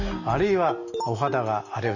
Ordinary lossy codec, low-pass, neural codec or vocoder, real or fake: none; 7.2 kHz; none; real